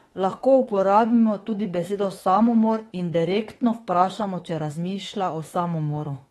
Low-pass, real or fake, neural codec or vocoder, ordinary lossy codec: 19.8 kHz; fake; autoencoder, 48 kHz, 32 numbers a frame, DAC-VAE, trained on Japanese speech; AAC, 32 kbps